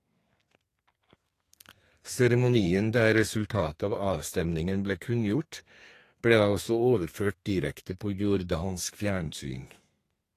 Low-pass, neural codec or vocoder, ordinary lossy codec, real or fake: 14.4 kHz; codec, 32 kHz, 1.9 kbps, SNAC; AAC, 48 kbps; fake